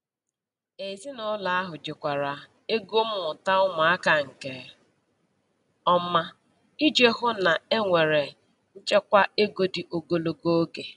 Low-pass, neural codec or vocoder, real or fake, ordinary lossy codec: 10.8 kHz; none; real; none